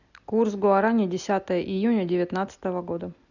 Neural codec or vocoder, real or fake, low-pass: none; real; 7.2 kHz